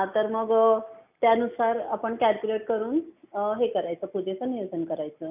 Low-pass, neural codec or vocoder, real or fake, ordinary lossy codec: 3.6 kHz; none; real; none